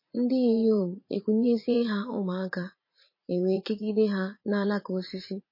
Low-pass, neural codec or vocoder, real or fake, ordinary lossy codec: 5.4 kHz; vocoder, 44.1 kHz, 80 mel bands, Vocos; fake; MP3, 24 kbps